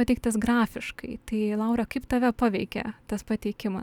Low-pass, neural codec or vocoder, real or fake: 19.8 kHz; none; real